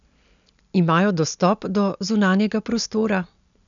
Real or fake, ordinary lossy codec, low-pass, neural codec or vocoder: real; none; 7.2 kHz; none